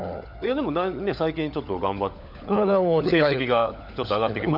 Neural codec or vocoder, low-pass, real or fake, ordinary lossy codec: codec, 16 kHz, 16 kbps, FunCodec, trained on LibriTTS, 50 frames a second; 5.4 kHz; fake; none